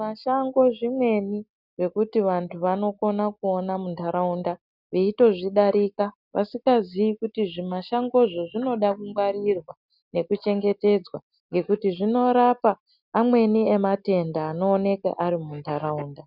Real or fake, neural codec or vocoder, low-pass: real; none; 5.4 kHz